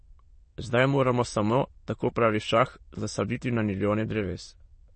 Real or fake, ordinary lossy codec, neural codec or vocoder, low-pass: fake; MP3, 32 kbps; autoencoder, 22.05 kHz, a latent of 192 numbers a frame, VITS, trained on many speakers; 9.9 kHz